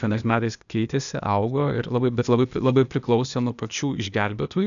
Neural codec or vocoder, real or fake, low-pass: codec, 16 kHz, 0.8 kbps, ZipCodec; fake; 7.2 kHz